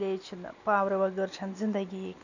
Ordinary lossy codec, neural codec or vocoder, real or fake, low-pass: none; none; real; 7.2 kHz